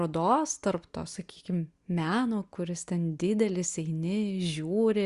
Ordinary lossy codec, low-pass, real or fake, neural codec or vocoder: Opus, 64 kbps; 10.8 kHz; real; none